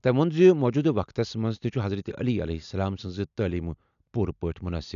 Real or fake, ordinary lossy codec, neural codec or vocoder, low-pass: real; none; none; 7.2 kHz